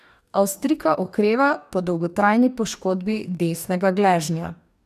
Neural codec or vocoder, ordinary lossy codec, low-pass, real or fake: codec, 44.1 kHz, 2.6 kbps, DAC; none; 14.4 kHz; fake